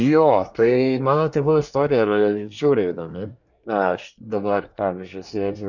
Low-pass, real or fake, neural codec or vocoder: 7.2 kHz; fake; codec, 24 kHz, 1 kbps, SNAC